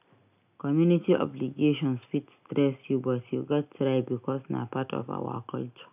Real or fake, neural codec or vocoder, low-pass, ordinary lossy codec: real; none; 3.6 kHz; none